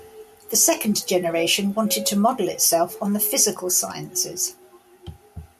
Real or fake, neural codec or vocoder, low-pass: real; none; 14.4 kHz